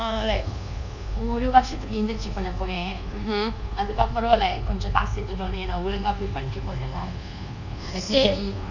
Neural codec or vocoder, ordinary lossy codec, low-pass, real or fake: codec, 24 kHz, 1.2 kbps, DualCodec; none; 7.2 kHz; fake